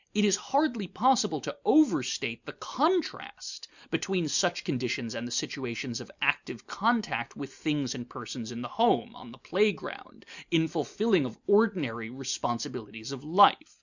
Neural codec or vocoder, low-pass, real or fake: none; 7.2 kHz; real